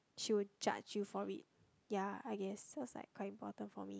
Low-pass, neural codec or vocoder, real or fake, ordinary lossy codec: none; none; real; none